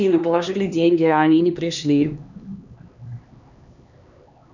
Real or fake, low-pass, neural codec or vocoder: fake; 7.2 kHz; codec, 16 kHz, 2 kbps, X-Codec, HuBERT features, trained on LibriSpeech